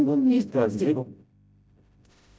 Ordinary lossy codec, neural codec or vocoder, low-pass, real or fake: none; codec, 16 kHz, 0.5 kbps, FreqCodec, smaller model; none; fake